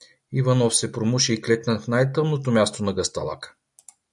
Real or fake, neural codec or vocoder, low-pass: real; none; 10.8 kHz